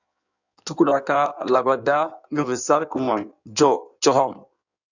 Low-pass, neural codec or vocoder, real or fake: 7.2 kHz; codec, 16 kHz in and 24 kHz out, 1.1 kbps, FireRedTTS-2 codec; fake